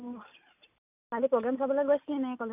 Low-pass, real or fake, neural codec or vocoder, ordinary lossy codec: 3.6 kHz; real; none; none